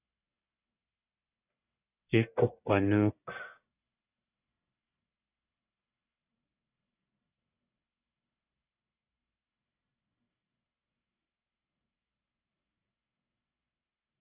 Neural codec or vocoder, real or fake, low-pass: codec, 44.1 kHz, 1.7 kbps, Pupu-Codec; fake; 3.6 kHz